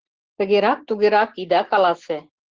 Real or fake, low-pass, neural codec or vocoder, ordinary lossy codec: real; 7.2 kHz; none; Opus, 16 kbps